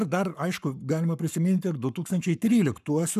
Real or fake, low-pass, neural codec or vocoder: fake; 14.4 kHz; codec, 44.1 kHz, 7.8 kbps, Pupu-Codec